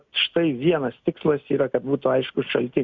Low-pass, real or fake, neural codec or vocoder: 7.2 kHz; real; none